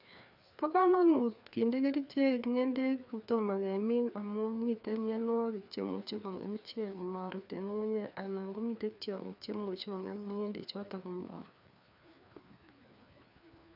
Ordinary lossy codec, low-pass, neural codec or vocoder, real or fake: none; 5.4 kHz; codec, 16 kHz, 2 kbps, FreqCodec, larger model; fake